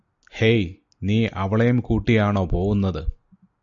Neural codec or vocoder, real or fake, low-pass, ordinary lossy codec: none; real; 7.2 kHz; MP3, 64 kbps